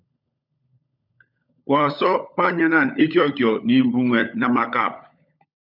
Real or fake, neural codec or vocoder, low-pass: fake; codec, 16 kHz, 16 kbps, FunCodec, trained on LibriTTS, 50 frames a second; 5.4 kHz